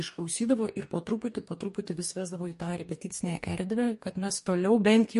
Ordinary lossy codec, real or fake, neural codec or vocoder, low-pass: MP3, 48 kbps; fake; codec, 44.1 kHz, 2.6 kbps, DAC; 14.4 kHz